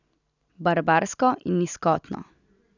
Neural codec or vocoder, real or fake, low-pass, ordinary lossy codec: none; real; 7.2 kHz; none